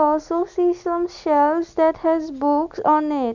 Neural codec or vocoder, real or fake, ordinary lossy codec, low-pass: none; real; none; 7.2 kHz